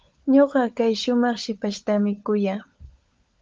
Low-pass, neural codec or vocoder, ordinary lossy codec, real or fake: 7.2 kHz; codec, 16 kHz, 16 kbps, FunCodec, trained on LibriTTS, 50 frames a second; Opus, 24 kbps; fake